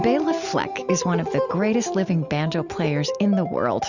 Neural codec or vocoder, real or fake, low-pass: none; real; 7.2 kHz